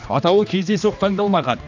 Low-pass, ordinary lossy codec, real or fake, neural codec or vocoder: 7.2 kHz; none; fake; codec, 16 kHz, 2 kbps, X-Codec, HuBERT features, trained on general audio